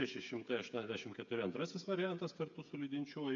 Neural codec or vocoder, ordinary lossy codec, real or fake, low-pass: codec, 16 kHz, 8 kbps, FreqCodec, smaller model; MP3, 64 kbps; fake; 7.2 kHz